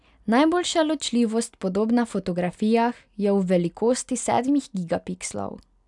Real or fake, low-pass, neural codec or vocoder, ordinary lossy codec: real; 10.8 kHz; none; none